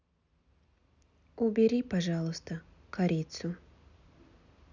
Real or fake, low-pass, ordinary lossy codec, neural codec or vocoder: real; 7.2 kHz; none; none